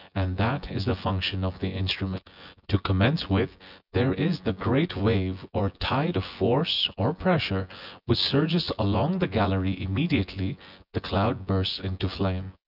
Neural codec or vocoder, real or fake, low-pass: vocoder, 24 kHz, 100 mel bands, Vocos; fake; 5.4 kHz